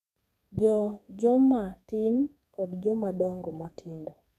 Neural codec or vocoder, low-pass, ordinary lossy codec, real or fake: codec, 32 kHz, 1.9 kbps, SNAC; 14.4 kHz; none; fake